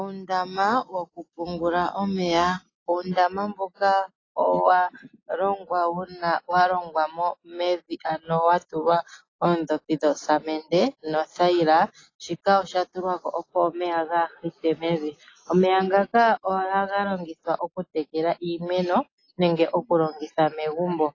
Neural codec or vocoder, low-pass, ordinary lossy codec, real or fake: none; 7.2 kHz; AAC, 32 kbps; real